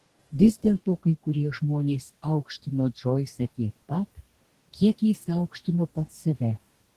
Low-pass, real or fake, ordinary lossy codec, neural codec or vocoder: 14.4 kHz; fake; Opus, 16 kbps; codec, 44.1 kHz, 2.6 kbps, DAC